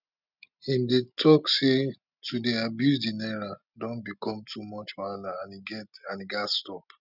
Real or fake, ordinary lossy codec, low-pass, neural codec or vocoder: real; none; 5.4 kHz; none